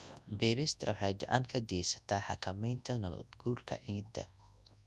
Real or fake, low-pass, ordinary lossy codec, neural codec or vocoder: fake; none; none; codec, 24 kHz, 0.9 kbps, WavTokenizer, large speech release